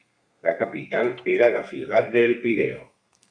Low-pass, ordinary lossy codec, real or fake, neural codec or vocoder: 9.9 kHz; MP3, 96 kbps; fake; codec, 32 kHz, 1.9 kbps, SNAC